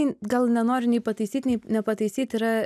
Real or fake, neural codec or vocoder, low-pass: real; none; 14.4 kHz